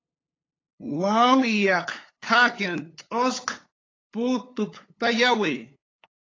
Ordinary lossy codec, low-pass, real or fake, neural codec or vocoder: AAC, 32 kbps; 7.2 kHz; fake; codec, 16 kHz, 8 kbps, FunCodec, trained on LibriTTS, 25 frames a second